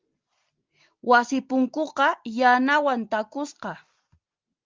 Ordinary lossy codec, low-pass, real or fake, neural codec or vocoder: Opus, 24 kbps; 7.2 kHz; real; none